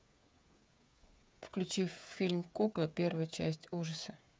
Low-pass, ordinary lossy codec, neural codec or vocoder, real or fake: none; none; codec, 16 kHz, 8 kbps, FreqCodec, smaller model; fake